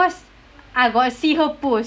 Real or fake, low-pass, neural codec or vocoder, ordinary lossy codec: real; none; none; none